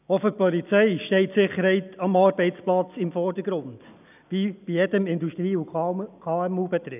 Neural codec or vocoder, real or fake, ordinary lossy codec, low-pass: none; real; none; 3.6 kHz